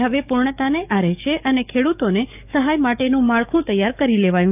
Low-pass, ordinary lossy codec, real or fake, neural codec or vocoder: 3.6 kHz; none; fake; codec, 44.1 kHz, 7.8 kbps, DAC